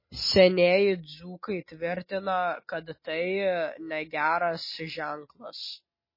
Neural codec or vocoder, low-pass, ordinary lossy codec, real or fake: none; 5.4 kHz; MP3, 24 kbps; real